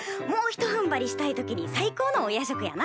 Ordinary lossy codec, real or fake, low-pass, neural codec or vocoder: none; real; none; none